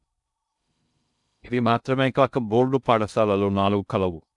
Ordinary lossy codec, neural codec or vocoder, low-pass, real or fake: none; codec, 16 kHz in and 24 kHz out, 0.6 kbps, FocalCodec, streaming, 2048 codes; 10.8 kHz; fake